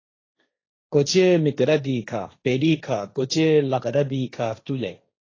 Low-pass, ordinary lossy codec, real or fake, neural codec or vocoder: 7.2 kHz; AAC, 32 kbps; fake; codec, 16 kHz, 1.1 kbps, Voila-Tokenizer